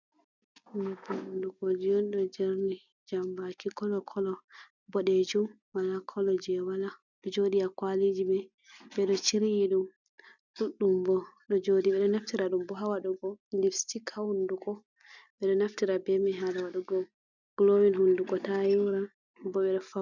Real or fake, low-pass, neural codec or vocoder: real; 7.2 kHz; none